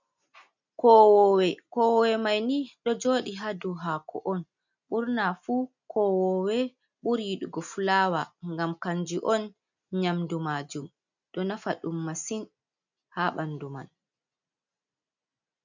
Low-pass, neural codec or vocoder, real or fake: 7.2 kHz; none; real